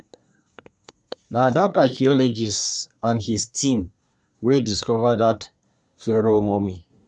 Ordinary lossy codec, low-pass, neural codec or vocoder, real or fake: none; 10.8 kHz; codec, 24 kHz, 1 kbps, SNAC; fake